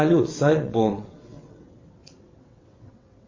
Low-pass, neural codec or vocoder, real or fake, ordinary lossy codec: 7.2 kHz; vocoder, 22.05 kHz, 80 mel bands, WaveNeXt; fake; MP3, 32 kbps